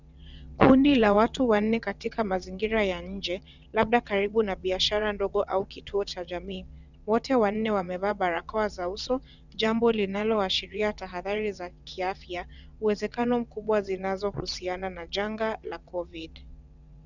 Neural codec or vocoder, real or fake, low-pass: vocoder, 22.05 kHz, 80 mel bands, WaveNeXt; fake; 7.2 kHz